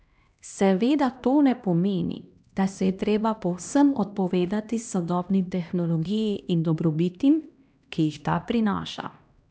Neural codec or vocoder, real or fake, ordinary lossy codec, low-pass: codec, 16 kHz, 1 kbps, X-Codec, HuBERT features, trained on LibriSpeech; fake; none; none